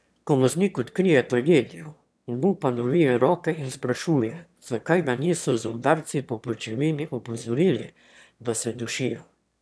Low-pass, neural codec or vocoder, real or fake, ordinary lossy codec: none; autoencoder, 22.05 kHz, a latent of 192 numbers a frame, VITS, trained on one speaker; fake; none